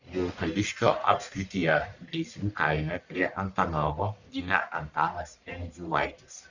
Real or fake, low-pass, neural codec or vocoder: fake; 7.2 kHz; codec, 44.1 kHz, 1.7 kbps, Pupu-Codec